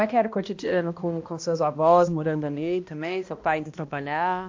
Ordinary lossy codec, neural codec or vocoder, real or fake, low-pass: MP3, 48 kbps; codec, 16 kHz, 1 kbps, X-Codec, HuBERT features, trained on balanced general audio; fake; 7.2 kHz